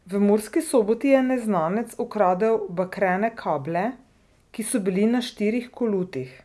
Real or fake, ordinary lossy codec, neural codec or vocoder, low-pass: real; none; none; none